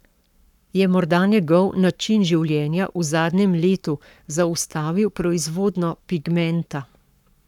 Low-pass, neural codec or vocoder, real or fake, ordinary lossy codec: 19.8 kHz; codec, 44.1 kHz, 7.8 kbps, Pupu-Codec; fake; none